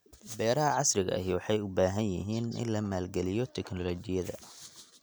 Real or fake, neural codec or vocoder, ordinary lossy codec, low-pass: real; none; none; none